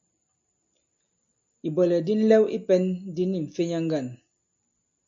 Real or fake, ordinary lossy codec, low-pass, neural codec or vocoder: real; MP3, 48 kbps; 7.2 kHz; none